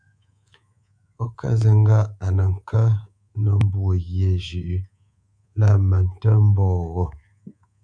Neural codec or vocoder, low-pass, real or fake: codec, 24 kHz, 3.1 kbps, DualCodec; 9.9 kHz; fake